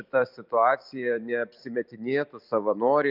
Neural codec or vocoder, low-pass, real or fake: vocoder, 44.1 kHz, 128 mel bands every 256 samples, BigVGAN v2; 5.4 kHz; fake